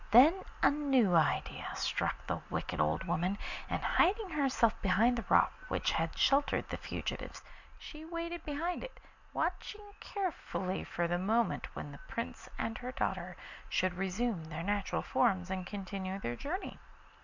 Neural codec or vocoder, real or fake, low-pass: none; real; 7.2 kHz